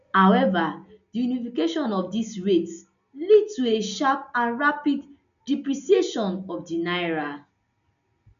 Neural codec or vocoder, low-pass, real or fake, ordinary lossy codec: none; 7.2 kHz; real; none